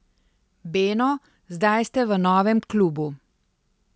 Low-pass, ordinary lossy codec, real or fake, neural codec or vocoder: none; none; real; none